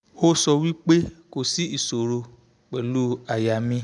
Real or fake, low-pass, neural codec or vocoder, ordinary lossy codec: real; 10.8 kHz; none; none